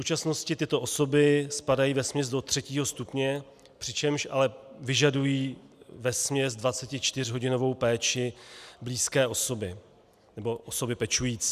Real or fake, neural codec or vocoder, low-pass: real; none; 14.4 kHz